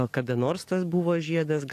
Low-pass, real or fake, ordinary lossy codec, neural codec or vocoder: 14.4 kHz; fake; AAC, 64 kbps; codec, 44.1 kHz, 7.8 kbps, DAC